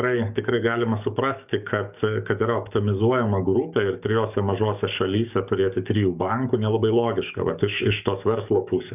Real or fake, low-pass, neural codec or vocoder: fake; 3.6 kHz; codec, 44.1 kHz, 7.8 kbps, DAC